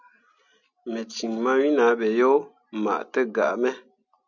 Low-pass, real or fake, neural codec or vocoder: 7.2 kHz; real; none